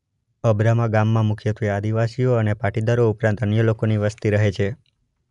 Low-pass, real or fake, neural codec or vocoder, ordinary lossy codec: 10.8 kHz; real; none; none